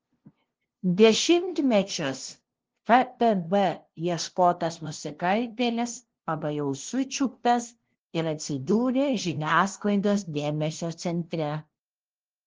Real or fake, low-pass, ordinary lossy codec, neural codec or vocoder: fake; 7.2 kHz; Opus, 16 kbps; codec, 16 kHz, 0.5 kbps, FunCodec, trained on LibriTTS, 25 frames a second